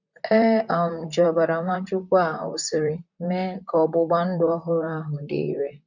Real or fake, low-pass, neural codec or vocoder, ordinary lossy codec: fake; 7.2 kHz; vocoder, 44.1 kHz, 128 mel bands, Pupu-Vocoder; none